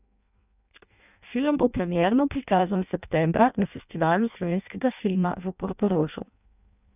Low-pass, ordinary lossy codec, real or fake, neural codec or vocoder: 3.6 kHz; none; fake; codec, 16 kHz in and 24 kHz out, 0.6 kbps, FireRedTTS-2 codec